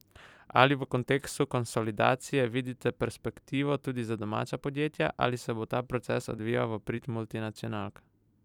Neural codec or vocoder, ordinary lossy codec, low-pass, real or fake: vocoder, 44.1 kHz, 128 mel bands every 256 samples, BigVGAN v2; none; 19.8 kHz; fake